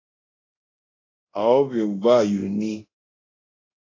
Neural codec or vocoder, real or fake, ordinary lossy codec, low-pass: codec, 24 kHz, 0.9 kbps, DualCodec; fake; AAC, 32 kbps; 7.2 kHz